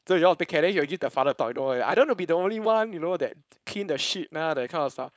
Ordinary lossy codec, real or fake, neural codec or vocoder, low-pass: none; fake; codec, 16 kHz, 4.8 kbps, FACodec; none